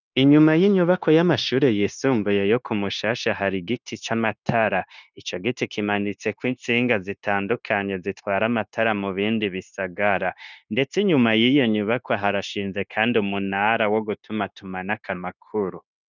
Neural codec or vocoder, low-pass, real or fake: codec, 16 kHz, 0.9 kbps, LongCat-Audio-Codec; 7.2 kHz; fake